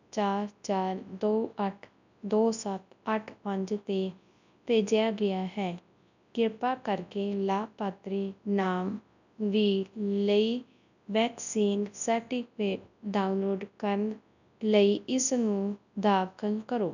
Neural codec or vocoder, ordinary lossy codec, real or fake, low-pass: codec, 24 kHz, 0.9 kbps, WavTokenizer, large speech release; none; fake; 7.2 kHz